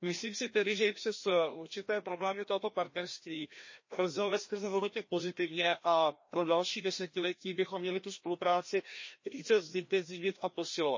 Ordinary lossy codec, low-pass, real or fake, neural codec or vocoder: MP3, 32 kbps; 7.2 kHz; fake; codec, 16 kHz, 1 kbps, FreqCodec, larger model